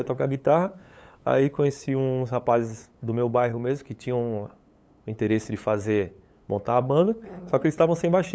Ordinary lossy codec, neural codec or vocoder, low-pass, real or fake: none; codec, 16 kHz, 8 kbps, FunCodec, trained on LibriTTS, 25 frames a second; none; fake